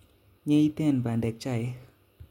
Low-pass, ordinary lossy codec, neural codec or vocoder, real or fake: 19.8 kHz; MP3, 64 kbps; none; real